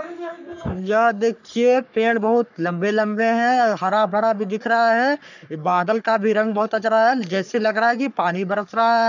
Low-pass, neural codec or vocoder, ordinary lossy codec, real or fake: 7.2 kHz; codec, 44.1 kHz, 3.4 kbps, Pupu-Codec; none; fake